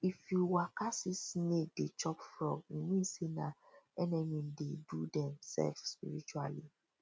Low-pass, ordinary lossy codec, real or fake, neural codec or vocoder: none; none; real; none